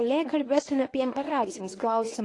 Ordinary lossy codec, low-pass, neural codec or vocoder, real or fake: AAC, 48 kbps; 10.8 kHz; codec, 24 kHz, 0.9 kbps, WavTokenizer, medium speech release version 1; fake